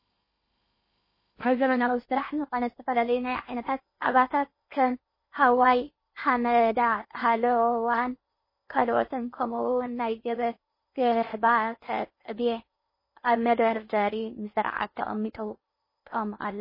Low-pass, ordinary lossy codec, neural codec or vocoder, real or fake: 5.4 kHz; MP3, 24 kbps; codec, 16 kHz in and 24 kHz out, 0.8 kbps, FocalCodec, streaming, 65536 codes; fake